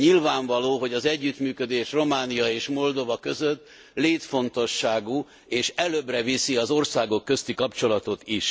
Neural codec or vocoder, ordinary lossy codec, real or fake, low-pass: none; none; real; none